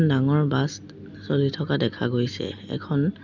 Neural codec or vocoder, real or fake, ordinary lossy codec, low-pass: none; real; none; 7.2 kHz